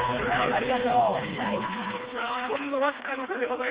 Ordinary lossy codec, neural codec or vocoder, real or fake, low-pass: Opus, 32 kbps; codec, 16 kHz, 2 kbps, FreqCodec, smaller model; fake; 3.6 kHz